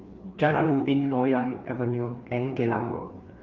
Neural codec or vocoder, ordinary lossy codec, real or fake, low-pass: codec, 16 kHz, 2 kbps, FreqCodec, larger model; Opus, 32 kbps; fake; 7.2 kHz